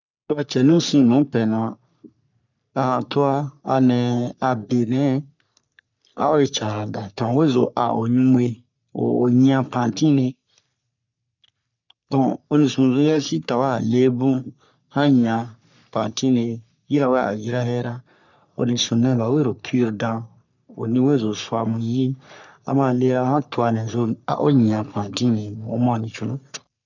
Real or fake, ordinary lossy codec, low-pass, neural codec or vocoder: fake; none; 7.2 kHz; codec, 44.1 kHz, 3.4 kbps, Pupu-Codec